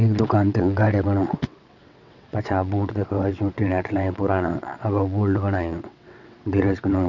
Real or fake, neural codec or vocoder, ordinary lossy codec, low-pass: fake; vocoder, 22.05 kHz, 80 mel bands, WaveNeXt; none; 7.2 kHz